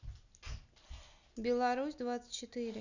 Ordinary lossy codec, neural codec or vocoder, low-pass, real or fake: none; none; 7.2 kHz; real